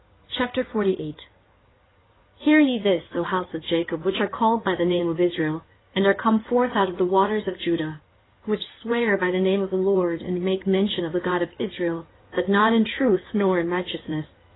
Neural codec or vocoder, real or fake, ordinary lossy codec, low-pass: codec, 16 kHz in and 24 kHz out, 2.2 kbps, FireRedTTS-2 codec; fake; AAC, 16 kbps; 7.2 kHz